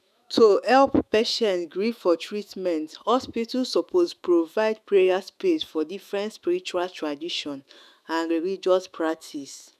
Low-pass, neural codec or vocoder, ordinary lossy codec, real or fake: 14.4 kHz; autoencoder, 48 kHz, 128 numbers a frame, DAC-VAE, trained on Japanese speech; none; fake